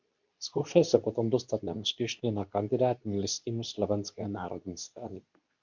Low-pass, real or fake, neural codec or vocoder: 7.2 kHz; fake; codec, 24 kHz, 0.9 kbps, WavTokenizer, medium speech release version 2